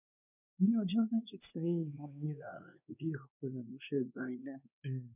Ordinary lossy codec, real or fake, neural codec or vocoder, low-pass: none; fake; codec, 16 kHz, 2 kbps, X-Codec, WavLM features, trained on Multilingual LibriSpeech; 3.6 kHz